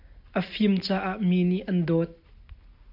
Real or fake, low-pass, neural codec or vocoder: real; 5.4 kHz; none